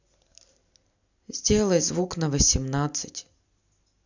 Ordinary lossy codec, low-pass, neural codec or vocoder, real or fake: none; 7.2 kHz; none; real